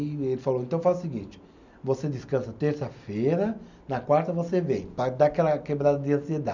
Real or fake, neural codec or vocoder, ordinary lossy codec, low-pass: real; none; none; 7.2 kHz